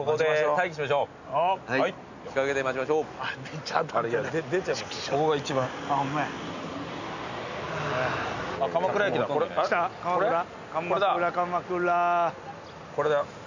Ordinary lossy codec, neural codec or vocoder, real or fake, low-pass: none; none; real; 7.2 kHz